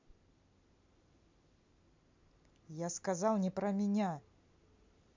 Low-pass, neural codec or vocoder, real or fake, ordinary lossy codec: 7.2 kHz; none; real; MP3, 64 kbps